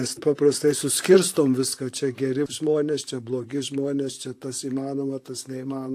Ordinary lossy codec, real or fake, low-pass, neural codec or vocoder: AAC, 64 kbps; fake; 14.4 kHz; vocoder, 44.1 kHz, 128 mel bands, Pupu-Vocoder